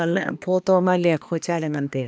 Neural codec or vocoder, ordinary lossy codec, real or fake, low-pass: codec, 16 kHz, 2 kbps, X-Codec, HuBERT features, trained on balanced general audio; none; fake; none